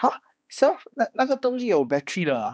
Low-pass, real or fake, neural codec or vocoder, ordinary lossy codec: none; fake; codec, 16 kHz, 2 kbps, X-Codec, HuBERT features, trained on balanced general audio; none